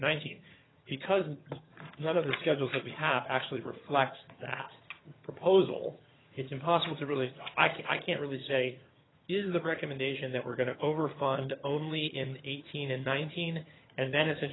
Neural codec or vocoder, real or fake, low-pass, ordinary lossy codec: vocoder, 22.05 kHz, 80 mel bands, HiFi-GAN; fake; 7.2 kHz; AAC, 16 kbps